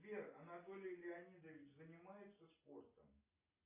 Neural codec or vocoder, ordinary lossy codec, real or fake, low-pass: none; AAC, 24 kbps; real; 3.6 kHz